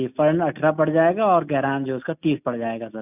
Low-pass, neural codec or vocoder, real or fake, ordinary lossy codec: 3.6 kHz; none; real; none